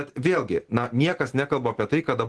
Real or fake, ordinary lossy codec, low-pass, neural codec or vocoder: real; Opus, 16 kbps; 10.8 kHz; none